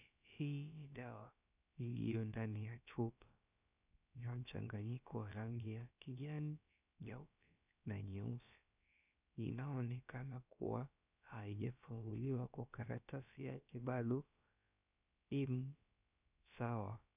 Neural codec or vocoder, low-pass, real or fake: codec, 16 kHz, about 1 kbps, DyCAST, with the encoder's durations; 3.6 kHz; fake